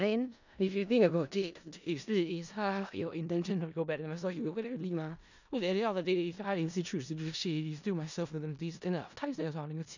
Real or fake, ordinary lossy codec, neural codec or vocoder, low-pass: fake; none; codec, 16 kHz in and 24 kHz out, 0.4 kbps, LongCat-Audio-Codec, four codebook decoder; 7.2 kHz